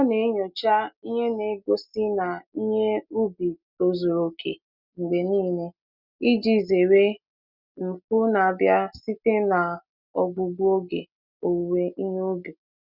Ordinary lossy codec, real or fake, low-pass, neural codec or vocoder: none; real; 5.4 kHz; none